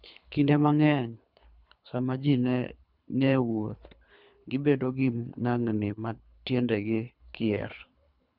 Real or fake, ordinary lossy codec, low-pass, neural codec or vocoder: fake; none; 5.4 kHz; codec, 24 kHz, 3 kbps, HILCodec